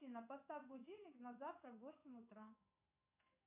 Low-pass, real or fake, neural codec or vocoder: 3.6 kHz; fake; codec, 16 kHz in and 24 kHz out, 1 kbps, XY-Tokenizer